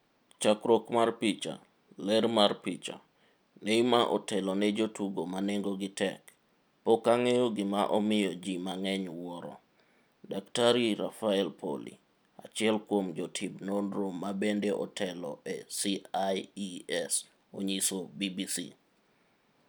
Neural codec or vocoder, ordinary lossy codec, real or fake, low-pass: none; none; real; none